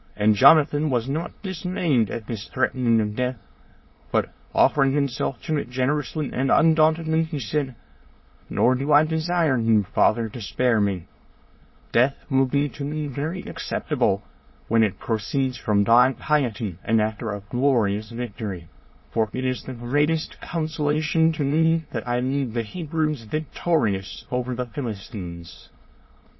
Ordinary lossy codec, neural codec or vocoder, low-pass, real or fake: MP3, 24 kbps; autoencoder, 22.05 kHz, a latent of 192 numbers a frame, VITS, trained on many speakers; 7.2 kHz; fake